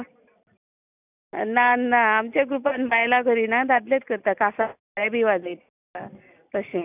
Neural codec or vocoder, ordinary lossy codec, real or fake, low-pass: none; none; real; 3.6 kHz